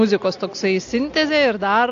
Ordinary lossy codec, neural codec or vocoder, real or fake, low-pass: AAC, 48 kbps; none; real; 7.2 kHz